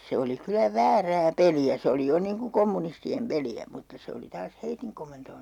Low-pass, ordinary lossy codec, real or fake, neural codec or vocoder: 19.8 kHz; none; real; none